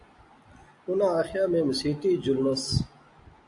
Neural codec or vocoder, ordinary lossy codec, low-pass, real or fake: none; AAC, 64 kbps; 10.8 kHz; real